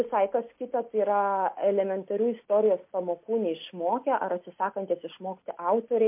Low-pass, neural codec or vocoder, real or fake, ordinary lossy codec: 3.6 kHz; none; real; MP3, 32 kbps